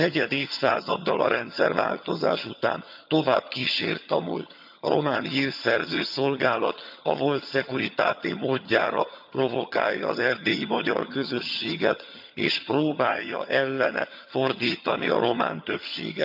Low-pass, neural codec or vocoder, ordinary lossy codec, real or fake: 5.4 kHz; vocoder, 22.05 kHz, 80 mel bands, HiFi-GAN; none; fake